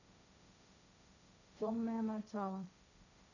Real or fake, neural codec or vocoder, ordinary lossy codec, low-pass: fake; codec, 16 kHz, 1.1 kbps, Voila-Tokenizer; none; 7.2 kHz